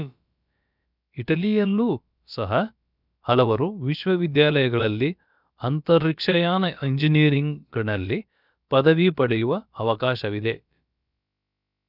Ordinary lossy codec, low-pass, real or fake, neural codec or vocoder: none; 5.4 kHz; fake; codec, 16 kHz, about 1 kbps, DyCAST, with the encoder's durations